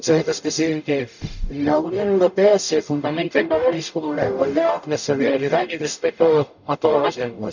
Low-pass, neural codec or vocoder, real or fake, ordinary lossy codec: 7.2 kHz; codec, 44.1 kHz, 0.9 kbps, DAC; fake; none